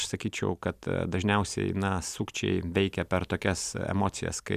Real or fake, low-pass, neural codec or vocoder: real; 14.4 kHz; none